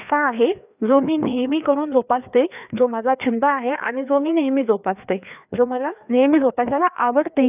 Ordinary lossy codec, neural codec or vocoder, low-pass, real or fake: none; codec, 16 kHz, 2 kbps, FreqCodec, larger model; 3.6 kHz; fake